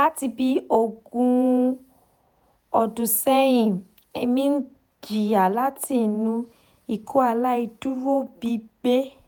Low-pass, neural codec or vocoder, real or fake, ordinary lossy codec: none; vocoder, 48 kHz, 128 mel bands, Vocos; fake; none